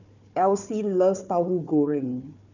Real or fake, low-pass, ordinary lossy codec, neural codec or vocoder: fake; 7.2 kHz; none; codec, 16 kHz, 4 kbps, FunCodec, trained on Chinese and English, 50 frames a second